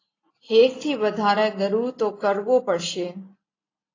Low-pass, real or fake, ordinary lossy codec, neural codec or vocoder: 7.2 kHz; real; AAC, 32 kbps; none